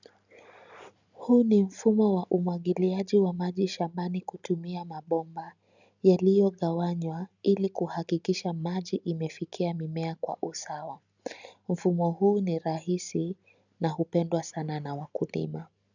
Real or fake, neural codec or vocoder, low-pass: real; none; 7.2 kHz